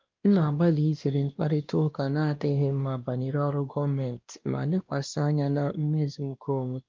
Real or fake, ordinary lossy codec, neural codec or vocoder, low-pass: fake; Opus, 32 kbps; codec, 16 kHz, 1 kbps, X-Codec, WavLM features, trained on Multilingual LibriSpeech; 7.2 kHz